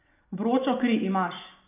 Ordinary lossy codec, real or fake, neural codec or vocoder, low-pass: none; real; none; 3.6 kHz